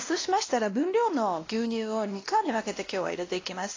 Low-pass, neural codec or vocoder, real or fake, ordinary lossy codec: 7.2 kHz; codec, 16 kHz, 1 kbps, X-Codec, WavLM features, trained on Multilingual LibriSpeech; fake; AAC, 32 kbps